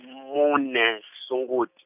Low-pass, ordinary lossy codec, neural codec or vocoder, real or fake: 3.6 kHz; none; none; real